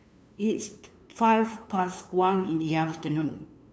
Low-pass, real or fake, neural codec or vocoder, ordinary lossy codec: none; fake; codec, 16 kHz, 2 kbps, FunCodec, trained on LibriTTS, 25 frames a second; none